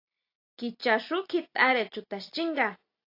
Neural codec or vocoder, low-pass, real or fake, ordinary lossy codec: none; 5.4 kHz; real; AAC, 32 kbps